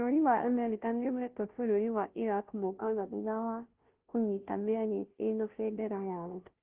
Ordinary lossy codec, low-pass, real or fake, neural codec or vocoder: Opus, 16 kbps; 3.6 kHz; fake; codec, 16 kHz, 0.5 kbps, FunCodec, trained on Chinese and English, 25 frames a second